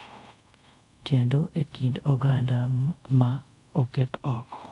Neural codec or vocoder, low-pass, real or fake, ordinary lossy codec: codec, 24 kHz, 0.5 kbps, DualCodec; 10.8 kHz; fake; none